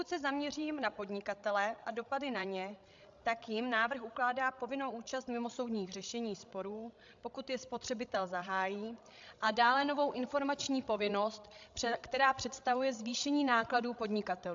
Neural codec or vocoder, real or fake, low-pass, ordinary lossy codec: codec, 16 kHz, 16 kbps, FreqCodec, larger model; fake; 7.2 kHz; AAC, 64 kbps